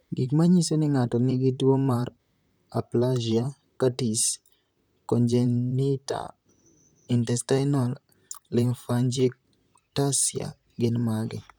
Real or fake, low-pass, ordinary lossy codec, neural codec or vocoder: fake; none; none; vocoder, 44.1 kHz, 128 mel bands, Pupu-Vocoder